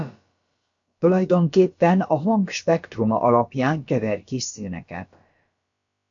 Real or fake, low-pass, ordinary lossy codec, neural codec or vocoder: fake; 7.2 kHz; AAC, 64 kbps; codec, 16 kHz, about 1 kbps, DyCAST, with the encoder's durations